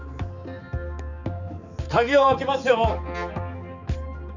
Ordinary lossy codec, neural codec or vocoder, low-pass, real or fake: none; codec, 16 kHz, 4 kbps, X-Codec, HuBERT features, trained on balanced general audio; 7.2 kHz; fake